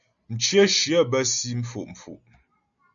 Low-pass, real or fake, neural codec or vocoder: 7.2 kHz; real; none